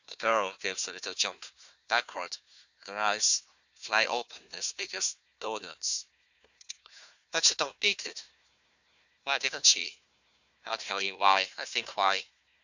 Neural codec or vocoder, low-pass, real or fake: codec, 16 kHz, 1 kbps, FunCodec, trained on Chinese and English, 50 frames a second; 7.2 kHz; fake